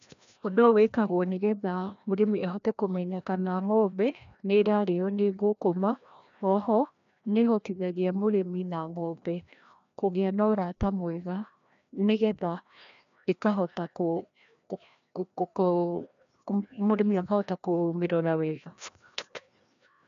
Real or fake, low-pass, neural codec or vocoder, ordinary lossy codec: fake; 7.2 kHz; codec, 16 kHz, 1 kbps, FreqCodec, larger model; AAC, 96 kbps